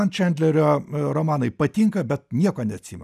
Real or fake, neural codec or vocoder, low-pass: real; none; 14.4 kHz